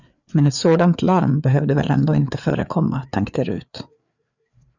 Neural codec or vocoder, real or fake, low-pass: codec, 16 kHz, 4 kbps, FreqCodec, larger model; fake; 7.2 kHz